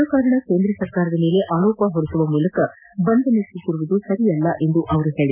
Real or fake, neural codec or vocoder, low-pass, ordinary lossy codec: real; none; 3.6 kHz; none